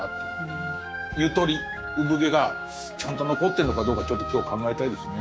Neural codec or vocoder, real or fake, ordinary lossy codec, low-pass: codec, 16 kHz, 6 kbps, DAC; fake; none; none